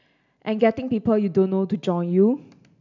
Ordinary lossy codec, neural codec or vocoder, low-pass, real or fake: none; none; 7.2 kHz; real